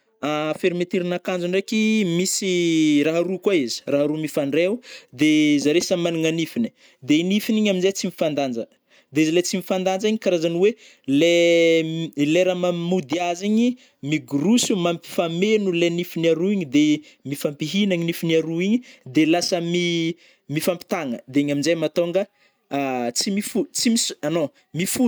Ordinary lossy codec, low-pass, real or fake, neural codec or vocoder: none; none; real; none